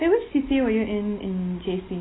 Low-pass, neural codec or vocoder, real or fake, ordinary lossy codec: 7.2 kHz; none; real; AAC, 16 kbps